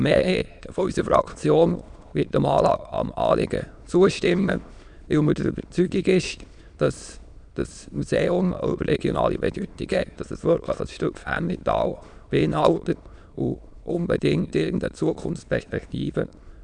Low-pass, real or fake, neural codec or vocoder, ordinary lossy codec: 9.9 kHz; fake; autoencoder, 22.05 kHz, a latent of 192 numbers a frame, VITS, trained on many speakers; none